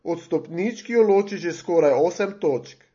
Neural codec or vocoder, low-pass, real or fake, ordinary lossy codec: none; 7.2 kHz; real; MP3, 32 kbps